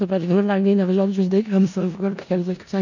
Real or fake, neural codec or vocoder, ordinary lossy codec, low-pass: fake; codec, 16 kHz in and 24 kHz out, 0.4 kbps, LongCat-Audio-Codec, four codebook decoder; none; 7.2 kHz